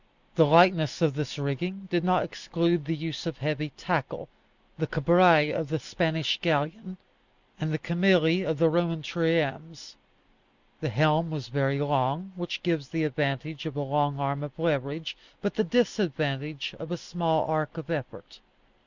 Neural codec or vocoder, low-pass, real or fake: none; 7.2 kHz; real